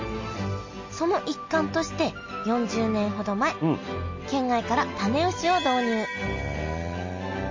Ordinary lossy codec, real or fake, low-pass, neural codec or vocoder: MP3, 32 kbps; real; 7.2 kHz; none